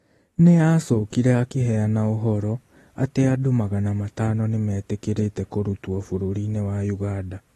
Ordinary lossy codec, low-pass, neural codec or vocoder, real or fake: AAC, 32 kbps; 14.4 kHz; none; real